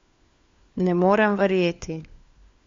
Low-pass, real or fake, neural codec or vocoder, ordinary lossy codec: 7.2 kHz; fake; codec, 16 kHz, 4 kbps, FunCodec, trained on LibriTTS, 50 frames a second; MP3, 48 kbps